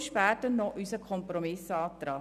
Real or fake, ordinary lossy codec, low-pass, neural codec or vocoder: real; none; 14.4 kHz; none